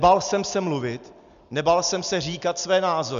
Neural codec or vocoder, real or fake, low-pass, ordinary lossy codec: none; real; 7.2 kHz; MP3, 96 kbps